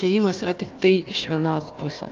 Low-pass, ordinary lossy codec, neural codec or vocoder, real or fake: 7.2 kHz; Opus, 24 kbps; codec, 16 kHz, 1 kbps, FunCodec, trained on Chinese and English, 50 frames a second; fake